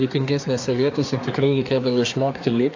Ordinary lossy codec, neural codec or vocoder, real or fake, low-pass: AAC, 48 kbps; codec, 24 kHz, 1 kbps, SNAC; fake; 7.2 kHz